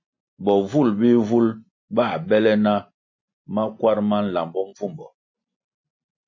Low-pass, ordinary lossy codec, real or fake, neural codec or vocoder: 7.2 kHz; MP3, 32 kbps; real; none